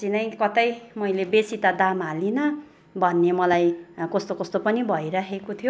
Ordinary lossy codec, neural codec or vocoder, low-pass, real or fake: none; none; none; real